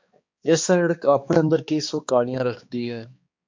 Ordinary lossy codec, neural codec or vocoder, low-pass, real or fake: MP3, 48 kbps; codec, 16 kHz, 2 kbps, X-Codec, HuBERT features, trained on balanced general audio; 7.2 kHz; fake